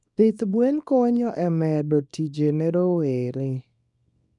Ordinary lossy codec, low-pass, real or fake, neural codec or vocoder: none; 10.8 kHz; fake; codec, 24 kHz, 0.9 kbps, WavTokenizer, small release